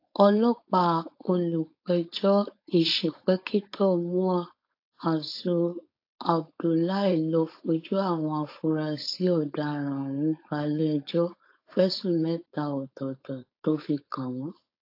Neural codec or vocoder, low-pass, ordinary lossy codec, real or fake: codec, 16 kHz, 4.8 kbps, FACodec; 5.4 kHz; AAC, 32 kbps; fake